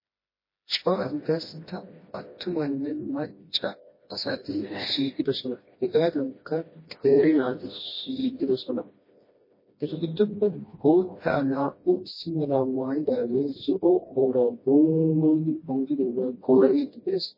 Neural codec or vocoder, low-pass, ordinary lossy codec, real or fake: codec, 16 kHz, 1 kbps, FreqCodec, smaller model; 5.4 kHz; MP3, 24 kbps; fake